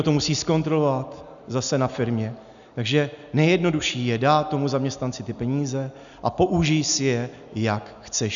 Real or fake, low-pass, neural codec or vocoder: real; 7.2 kHz; none